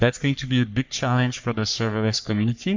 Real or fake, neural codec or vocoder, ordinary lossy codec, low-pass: fake; codec, 44.1 kHz, 3.4 kbps, Pupu-Codec; MP3, 64 kbps; 7.2 kHz